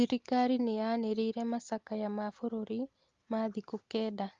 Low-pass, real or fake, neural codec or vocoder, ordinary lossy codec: 7.2 kHz; real; none; Opus, 32 kbps